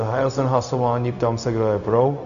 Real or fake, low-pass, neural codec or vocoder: fake; 7.2 kHz; codec, 16 kHz, 0.4 kbps, LongCat-Audio-Codec